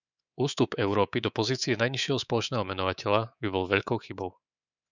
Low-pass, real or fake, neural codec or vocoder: 7.2 kHz; fake; codec, 24 kHz, 3.1 kbps, DualCodec